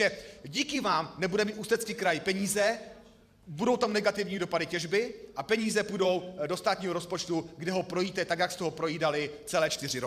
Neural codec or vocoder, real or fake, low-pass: vocoder, 44.1 kHz, 128 mel bands every 512 samples, BigVGAN v2; fake; 14.4 kHz